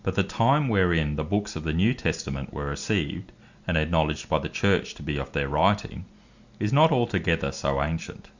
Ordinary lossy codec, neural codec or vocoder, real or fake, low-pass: Opus, 64 kbps; none; real; 7.2 kHz